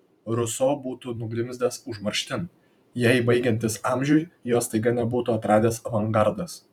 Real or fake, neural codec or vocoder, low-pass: fake; vocoder, 44.1 kHz, 128 mel bands every 512 samples, BigVGAN v2; 19.8 kHz